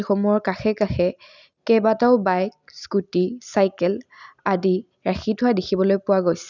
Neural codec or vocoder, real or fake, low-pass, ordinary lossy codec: none; real; 7.2 kHz; none